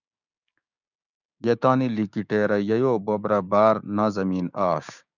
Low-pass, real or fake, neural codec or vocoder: 7.2 kHz; fake; codec, 16 kHz, 6 kbps, DAC